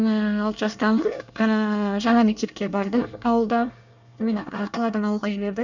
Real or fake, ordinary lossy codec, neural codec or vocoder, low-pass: fake; none; codec, 24 kHz, 1 kbps, SNAC; 7.2 kHz